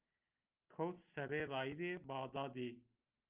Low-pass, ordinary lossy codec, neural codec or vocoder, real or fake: 3.6 kHz; Opus, 32 kbps; none; real